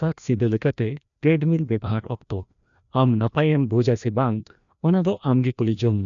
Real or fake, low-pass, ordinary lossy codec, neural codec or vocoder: fake; 7.2 kHz; none; codec, 16 kHz, 1 kbps, FreqCodec, larger model